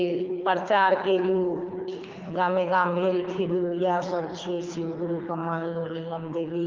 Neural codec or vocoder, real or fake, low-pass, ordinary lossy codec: codec, 24 kHz, 3 kbps, HILCodec; fake; 7.2 kHz; Opus, 32 kbps